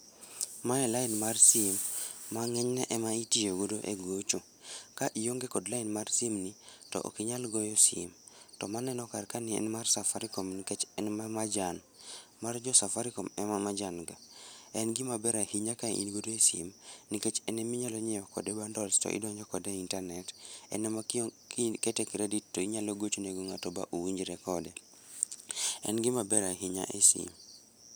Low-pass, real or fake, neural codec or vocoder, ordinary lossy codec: none; real; none; none